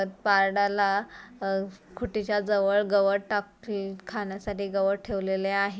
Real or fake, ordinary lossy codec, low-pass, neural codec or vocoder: real; none; none; none